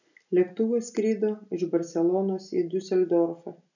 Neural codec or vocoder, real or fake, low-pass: none; real; 7.2 kHz